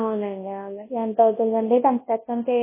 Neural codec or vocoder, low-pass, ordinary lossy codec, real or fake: codec, 24 kHz, 0.9 kbps, WavTokenizer, large speech release; 3.6 kHz; MP3, 16 kbps; fake